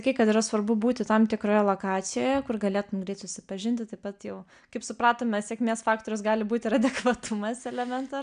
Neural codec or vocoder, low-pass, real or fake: none; 9.9 kHz; real